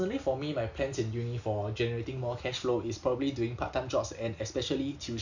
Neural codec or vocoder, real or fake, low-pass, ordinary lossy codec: none; real; 7.2 kHz; none